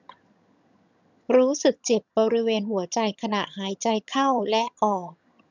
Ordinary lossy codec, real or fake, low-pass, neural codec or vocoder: none; fake; 7.2 kHz; vocoder, 22.05 kHz, 80 mel bands, HiFi-GAN